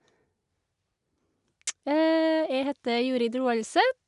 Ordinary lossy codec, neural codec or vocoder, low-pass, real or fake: none; none; 10.8 kHz; real